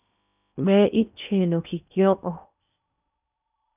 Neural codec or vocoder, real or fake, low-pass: codec, 16 kHz in and 24 kHz out, 0.8 kbps, FocalCodec, streaming, 65536 codes; fake; 3.6 kHz